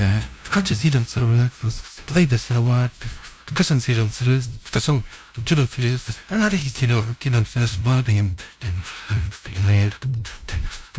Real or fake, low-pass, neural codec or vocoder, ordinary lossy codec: fake; none; codec, 16 kHz, 0.5 kbps, FunCodec, trained on LibriTTS, 25 frames a second; none